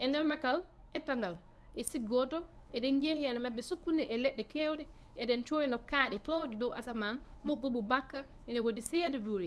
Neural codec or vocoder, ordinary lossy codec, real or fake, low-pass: codec, 24 kHz, 0.9 kbps, WavTokenizer, medium speech release version 1; none; fake; none